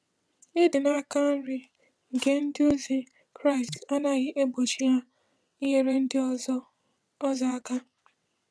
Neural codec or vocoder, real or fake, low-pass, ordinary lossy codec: vocoder, 22.05 kHz, 80 mel bands, WaveNeXt; fake; none; none